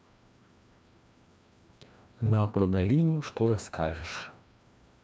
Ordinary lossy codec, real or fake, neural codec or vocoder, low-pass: none; fake; codec, 16 kHz, 1 kbps, FreqCodec, larger model; none